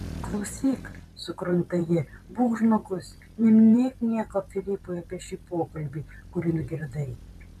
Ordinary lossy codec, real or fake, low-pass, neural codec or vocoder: MP3, 96 kbps; fake; 14.4 kHz; vocoder, 44.1 kHz, 128 mel bands every 512 samples, BigVGAN v2